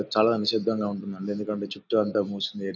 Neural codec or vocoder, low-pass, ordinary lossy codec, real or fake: none; 7.2 kHz; none; real